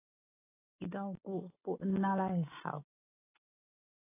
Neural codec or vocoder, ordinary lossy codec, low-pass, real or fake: none; AAC, 16 kbps; 3.6 kHz; real